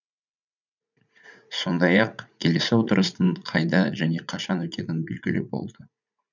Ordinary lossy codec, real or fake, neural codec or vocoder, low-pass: none; fake; vocoder, 44.1 kHz, 80 mel bands, Vocos; 7.2 kHz